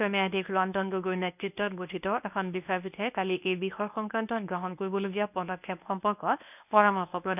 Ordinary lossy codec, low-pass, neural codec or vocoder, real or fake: none; 3.6 kHz; codec, 24 kHz, 0.9 kbps, WavTokenizer, medium speech release version 1; fake